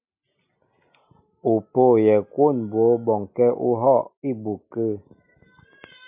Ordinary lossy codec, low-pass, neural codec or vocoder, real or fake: AAC, 32 kbps; 3.6 kHz; none; real